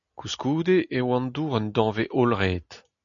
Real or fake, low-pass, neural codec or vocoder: real; 7.2 kHz; none